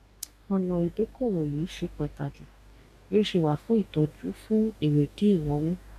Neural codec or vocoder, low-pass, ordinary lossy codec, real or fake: codec, 44.1 kHz, 2.6 kbps, DAC; 14.4 kHz; none; fake